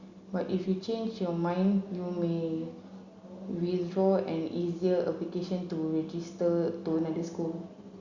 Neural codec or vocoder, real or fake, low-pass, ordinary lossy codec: none; real; 7.2 kHz; Opus, 64 kbps